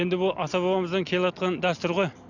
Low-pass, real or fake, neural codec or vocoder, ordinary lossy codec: 7.2 kHz; real; none; none